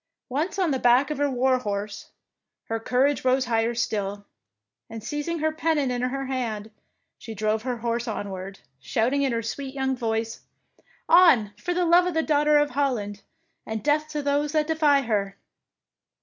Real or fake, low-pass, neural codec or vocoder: real; 7.2 kHz; none